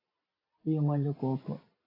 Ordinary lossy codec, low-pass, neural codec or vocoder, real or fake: AAC, 24 kbps; 5.4 kHz; none; real